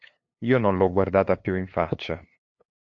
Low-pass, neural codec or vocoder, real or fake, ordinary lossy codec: 7.2 kHz; codec, 16 kHz, 2 kbps, FunCodec, trained on LibriTTS, 25 frames a second; fake; AAC, 48 kbps